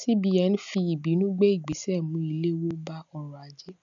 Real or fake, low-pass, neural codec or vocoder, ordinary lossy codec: real; 7.2 kHz; none; none